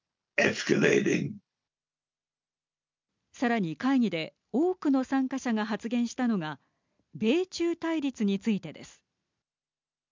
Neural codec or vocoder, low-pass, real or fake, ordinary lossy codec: none; 7.2 kHz; real; MP3, 64 kbps